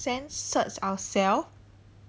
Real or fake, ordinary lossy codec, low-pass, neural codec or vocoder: real; none; none; none